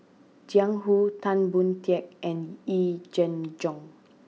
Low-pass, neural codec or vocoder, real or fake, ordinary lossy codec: none; none; real; none